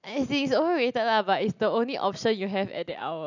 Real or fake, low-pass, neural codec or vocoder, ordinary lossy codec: real; 7.2 kHz; none; none